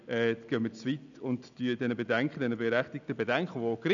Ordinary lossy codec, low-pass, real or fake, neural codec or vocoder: none; 7.2 kHz; real; none